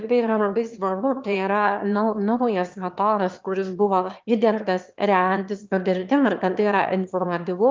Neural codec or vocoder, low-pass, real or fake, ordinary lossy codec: autoencoder, 22.05 kHz, a latent of 192 numbers a frame, VITS, trained on one speaker; 7.2 kHz; fake; Opus, 32 kbps